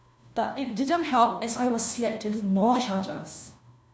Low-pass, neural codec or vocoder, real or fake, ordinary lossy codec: none; codec, 16 kHz, 1 kbps, FunCodec, trained on LibriTTS, 50 frames a second; fake; none